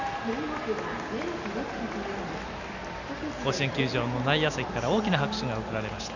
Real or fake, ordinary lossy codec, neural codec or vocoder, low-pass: real; none; none; 7.2 kHz